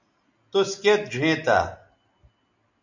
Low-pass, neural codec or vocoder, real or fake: 7.2 kHz; none; real